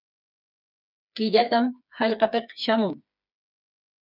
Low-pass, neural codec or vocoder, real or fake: 5.4 kHz; codec, 16 kHz, 4 kbps, FreqCodec, smaller model; fake